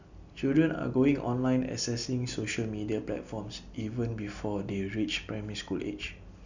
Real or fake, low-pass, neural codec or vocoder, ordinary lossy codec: real; 7.2 kHz; none; none